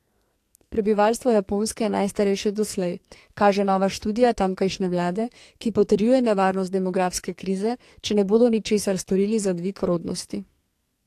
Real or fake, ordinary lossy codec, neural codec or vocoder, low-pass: fake; AAC, 64 kbps; codec, 44.1 kHz, 2.6 kbps, SNAC; 14.4 kHz